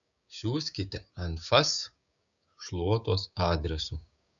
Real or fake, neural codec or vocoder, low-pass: fake; codec, 16 kHz, 6 kbps, DAC; 7.2 kHz